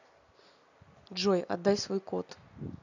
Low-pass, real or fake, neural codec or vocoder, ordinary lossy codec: 7.2 kHz; real; none; none